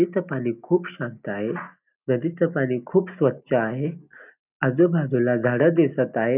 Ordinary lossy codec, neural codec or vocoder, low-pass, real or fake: none; none; 3.6 kHz; real